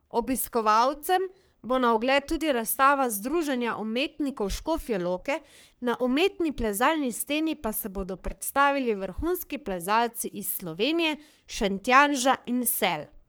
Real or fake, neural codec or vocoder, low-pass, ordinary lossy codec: fake; codec, 44.1 kHz, 3.4 kbps, Pupu-Codec; none; none